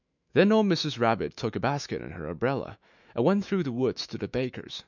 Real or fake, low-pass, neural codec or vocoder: fake; 7.2 kHz; autoencoder, 48 kHz, 128 numbers a frame, DAC-VAE, trained on Japanese speech